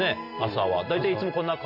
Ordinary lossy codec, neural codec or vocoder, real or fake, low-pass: none; none; real; 5.4 kHz